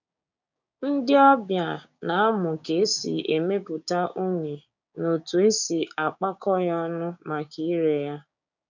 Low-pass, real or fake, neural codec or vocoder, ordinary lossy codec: 7.2 kHz; fake; codec, 16 kHz, 6 kbps, DAC; none